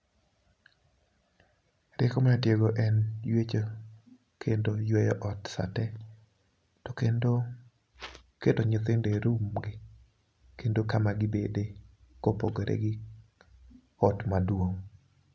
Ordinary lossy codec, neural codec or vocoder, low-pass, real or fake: none; none; none; real